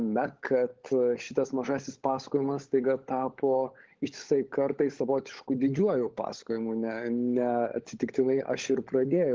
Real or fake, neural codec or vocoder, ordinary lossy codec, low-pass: fake; codec, 16 kHz, 16 kbps, FunCodec, trained on LibriTTS, 50 frames a second; Opus, 16 kbps; 7.2 kHz